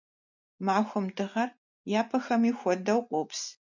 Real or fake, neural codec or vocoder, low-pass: real; none; 7.2 kHz